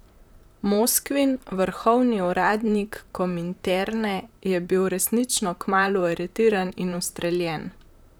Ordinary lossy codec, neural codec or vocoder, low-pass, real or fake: none; vocoder, 44.1 kHz, 128 mel bands, Pupu-Vocoder; none; fake